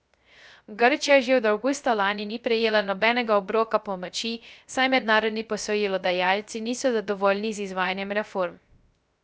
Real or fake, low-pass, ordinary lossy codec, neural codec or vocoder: fake; none; none; codec, 16 kHz, 0.3 kbps, FocalCodec